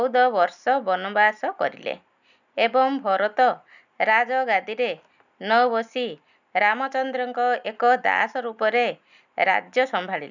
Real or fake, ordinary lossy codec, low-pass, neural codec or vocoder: real; none; 7.2 kHz; none